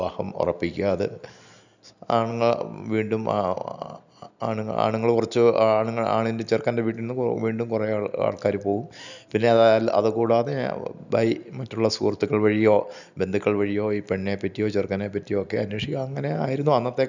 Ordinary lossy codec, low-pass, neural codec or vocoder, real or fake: none; 7.2 kHz; none; real